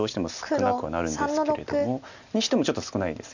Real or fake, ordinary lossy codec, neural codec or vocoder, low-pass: real; none; none; 7.2 kHz